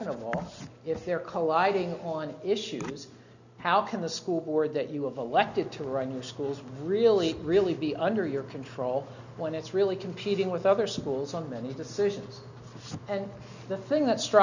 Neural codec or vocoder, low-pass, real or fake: none; 7.2 kHz; real